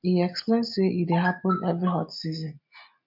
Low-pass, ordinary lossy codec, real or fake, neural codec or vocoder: 5.4 kHz; MP3, 48 kbps; real; none